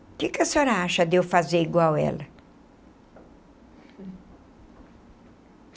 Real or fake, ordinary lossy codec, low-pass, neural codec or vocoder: real; none; none; none